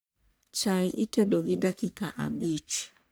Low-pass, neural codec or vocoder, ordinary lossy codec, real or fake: none; codec, 44.1 kHz, 1.7 kbps, Pupu-Codec; none; fake